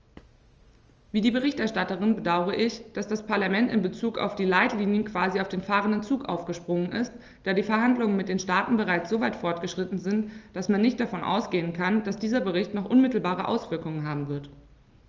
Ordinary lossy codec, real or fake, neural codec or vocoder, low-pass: Opus, 24 kbps; real; none; 7.2 kHz